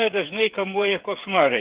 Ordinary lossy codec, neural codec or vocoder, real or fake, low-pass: Opus, 16 kbps; codec, 16 kHz, 8 kbps, FreqCodec, smaller model; fake; 3.6 kHz